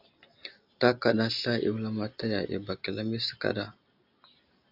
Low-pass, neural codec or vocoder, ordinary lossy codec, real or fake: 5.4 kHz; none; AAC, 48 kbps; real